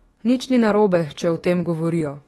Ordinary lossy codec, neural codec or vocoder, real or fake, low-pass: AAC, 32 kbps; autoencoder, 48 kHz, 32 numbers a frame, DAC-VAE, trained on Japanese speech; fake; 19.8 kHz